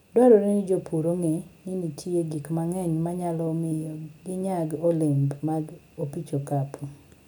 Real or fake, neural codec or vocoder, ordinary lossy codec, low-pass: real; none; none; none